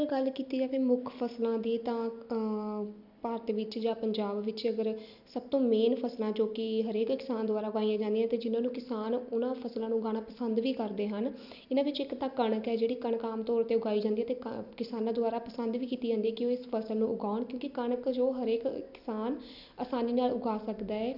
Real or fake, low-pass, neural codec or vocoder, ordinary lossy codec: real; 5.4 kHz; none; none